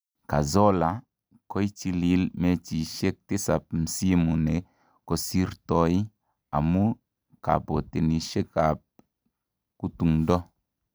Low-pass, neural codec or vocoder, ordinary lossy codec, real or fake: none; none; none; real